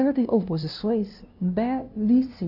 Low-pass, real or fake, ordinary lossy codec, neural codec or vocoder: 5.4 kHz; fake; none; codec, 16 kHz, 0.5 kbps, FunCodec, trained on LibriTTS, 25 frames a second